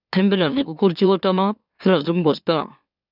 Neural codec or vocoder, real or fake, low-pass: autoencoder, 44.1 kHz, a latent of 192 numbers a frame, MeloTTS; fake; 5.4 kHz